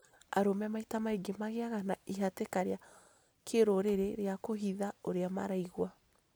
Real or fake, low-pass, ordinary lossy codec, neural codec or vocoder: real; none; none; none